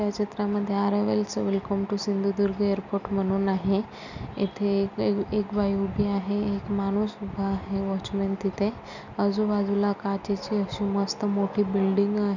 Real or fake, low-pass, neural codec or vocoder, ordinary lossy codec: real; 7.2 kHz; none; none